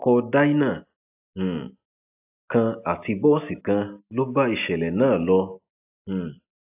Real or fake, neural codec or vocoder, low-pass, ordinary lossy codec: real; none; 3.6 kHz; AAC, 32 kbps